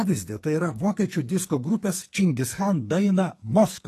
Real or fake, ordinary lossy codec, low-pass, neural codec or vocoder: fake; AAC, 48 kbps; 14.4 kHz; codec, 44.1 kHz, 2.6 kbps, SNAC